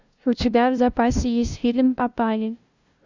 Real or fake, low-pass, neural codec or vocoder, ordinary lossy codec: fake; 7.2 kHz; codec, 16 kHz, 0.5 kbps, FunCodec, trained on LibriTTS, 25 frames a second; Opus, 64 kbps